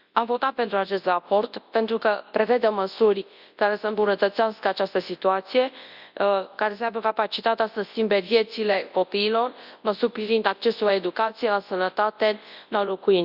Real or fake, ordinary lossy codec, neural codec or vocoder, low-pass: fake; Opus, 64 kbps; codec, 24 kHz, 0.9 kbps, WavTokenizer, large speech release; 5.4 kHz